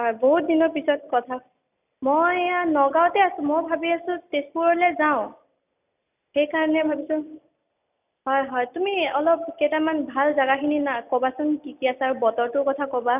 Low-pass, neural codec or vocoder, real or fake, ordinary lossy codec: 3.6 kHz; none; real; none